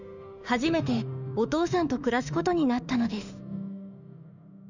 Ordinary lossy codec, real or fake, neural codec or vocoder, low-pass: none; fake; autoencoder, 48 kHz, 32 numbers a frame, DAC-VAE, trained on Japanese speech; 7.2 kHz